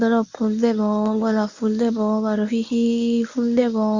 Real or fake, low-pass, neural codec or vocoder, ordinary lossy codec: fake; 7.2 kHz; codec, 24 kHz, 0.9 kbps, WavTokenizer, medium speech release version 1; Opus, 64 kbps